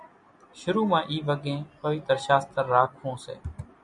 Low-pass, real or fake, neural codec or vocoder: 10.8 kHz; real; none